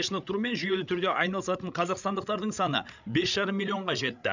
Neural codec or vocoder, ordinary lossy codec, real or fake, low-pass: codec, 16 kHz, 16 kbps, FreqCodec, larger model; none; fake; 7.2 kHz